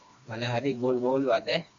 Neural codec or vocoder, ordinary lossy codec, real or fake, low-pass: codec, 16 kHz, 2 kbps, FreqCodec, smaller model; AAC, 64 kbps; fake; 7.2 kHz